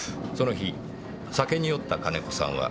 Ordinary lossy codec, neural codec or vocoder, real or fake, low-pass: none; none; real; none